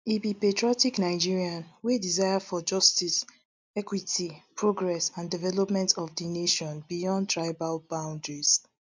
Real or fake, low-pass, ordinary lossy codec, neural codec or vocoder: real; 7.2 kHz; MP3, 64 kbps; none